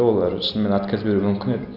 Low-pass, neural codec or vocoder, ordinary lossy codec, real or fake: 5.4 kHz; vocoder, 44.1 kHz, 128 mel bands every 256 samples, BigVGAN v2; none; fake